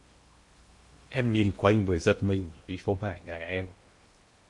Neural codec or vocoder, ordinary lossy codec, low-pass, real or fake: codec, 16 kHz in and 24 kHz out, 0.8 kbps, FocalCodec, streaming, 65536 codes; MP3, 48 kbps; 10.8 kHz; fake